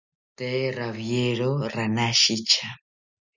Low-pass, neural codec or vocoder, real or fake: 7.2 kHz; none; real